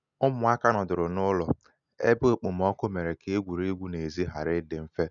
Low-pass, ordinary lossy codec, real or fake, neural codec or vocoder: 7.2 kHz; none; real; none